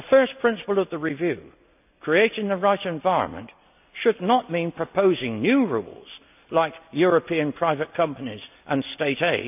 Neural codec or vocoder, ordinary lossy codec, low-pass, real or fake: none; none; 3.6 kHz; real